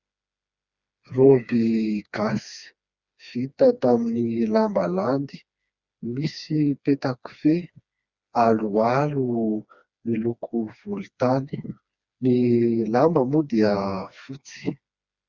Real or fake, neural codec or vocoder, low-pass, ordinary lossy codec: fake; codec, 16 kHz, 2 kbps, FreqCodec, smaller model; 7.2 kHz; Opus, 64 kbps